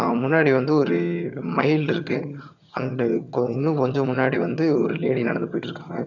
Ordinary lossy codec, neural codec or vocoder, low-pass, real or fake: none; vocoder, 22.05 kHz, 80 mel bands, HiFi-GAN; 7.2 kHz; fake